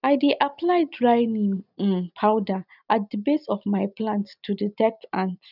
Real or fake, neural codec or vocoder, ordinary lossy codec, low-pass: real; none; none; 5.4 kHz